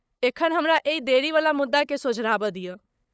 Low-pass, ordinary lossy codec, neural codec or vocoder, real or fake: none; none; codec, 16 kHz, 8 kbps, FunCodec, trained on LibriTTS, 25 frames a second; fake